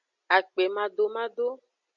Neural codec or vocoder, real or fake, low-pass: none; real; 7.2 kHz